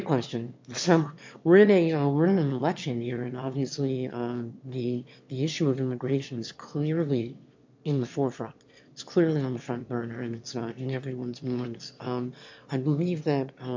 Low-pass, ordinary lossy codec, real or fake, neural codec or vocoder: 7.2 kHz; MP3, 48 kbps; fake; autoencoder, 22.05 kHz, a latent of 192 numbers a frame, VITS, trained on one speaker